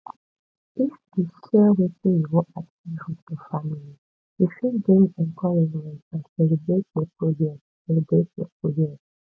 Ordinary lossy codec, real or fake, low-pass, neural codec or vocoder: none; real; none; none